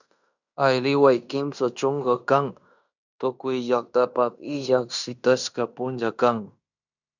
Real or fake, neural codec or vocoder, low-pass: fake; codec, 16 kHz in and 24 kHz out, 0.9 kbps, LongCat-Audio-Codec, fine tuned four codebook decoder; 7.2 kHz